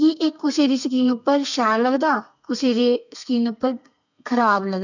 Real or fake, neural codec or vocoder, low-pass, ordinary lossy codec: fake; codec, 32 kHz, 1.9 kbps, SNAC; 7.2 kHz; none